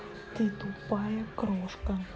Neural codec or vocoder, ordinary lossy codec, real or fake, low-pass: none; none; real; none